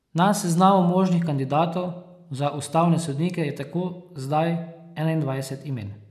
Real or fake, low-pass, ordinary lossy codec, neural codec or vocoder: real; 14.4 kHz; none; none